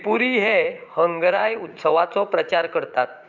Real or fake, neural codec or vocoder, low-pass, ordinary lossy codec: fake; vocoder, 44.1 kHz, 80 mel bands, Vocos; 7.2 kHz; none